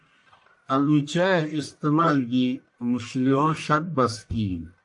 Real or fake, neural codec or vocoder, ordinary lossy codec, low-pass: fake; codec, 44.1 kHz, 1.7 kbps, Pupu-Codec; AAC, 64 kbps; 10.8 kHz